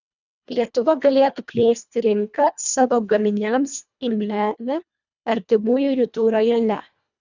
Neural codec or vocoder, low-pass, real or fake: codec, 24 kHz, 1.5 kbps, HILCodec; 7.2 kHz; fake